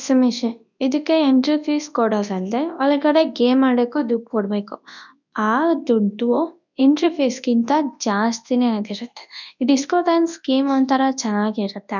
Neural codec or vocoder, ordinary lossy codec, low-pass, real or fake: codec, 24 kHz, 0.9 kbps, WavTokenizer, large speech release; none; 7.2 kHz; fake